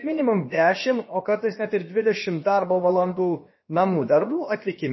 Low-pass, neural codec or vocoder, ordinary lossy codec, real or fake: 7.2 kHz; codec, 16 kHz, about 1 kbps, DyCAST, with the encoder's durations; MP3, 24 kbps; fake